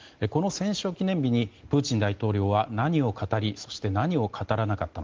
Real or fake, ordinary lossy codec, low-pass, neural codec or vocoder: real; Opus, 16 kbps; 7.2 kHz; none